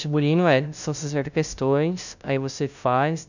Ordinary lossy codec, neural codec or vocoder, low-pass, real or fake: none; codec, 16 kHz, 0.5 kbps, FunCodec, trained on LibriTTS, 25 frames a second; 7.2 kHz; fake